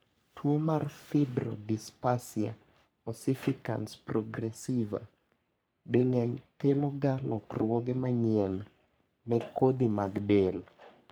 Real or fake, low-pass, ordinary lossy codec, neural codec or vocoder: fake; none; none; codec, 44.1 kHz, 3.4 kbps, Pupu-Codec